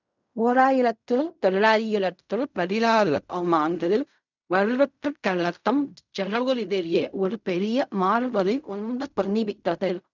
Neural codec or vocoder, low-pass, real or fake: codec, 16 kHz in and 24 kHz out, 0.4 kbps, LongCat-Audio-Codec, fine tuned four codebook decoder; 7.2 kHz; fake